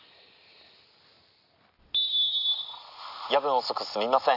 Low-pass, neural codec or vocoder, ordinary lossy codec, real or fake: 5.4 kHz; none; AAC, 48 kbps; real